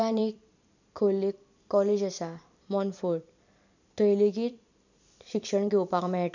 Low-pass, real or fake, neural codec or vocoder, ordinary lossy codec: 7.2 kHz; fake; vocoder, 44.1 kHz, 128 mel bands every 256 samples, BigVGAN v2; none